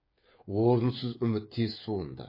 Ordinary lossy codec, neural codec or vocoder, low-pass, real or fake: MP3, 24 kbps; codec, 16 kHz, 8 kbps, FreqCodec, smaller model; 5.4 kHz; fake